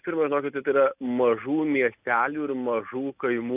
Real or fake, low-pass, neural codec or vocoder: real; 3.6 kHz; none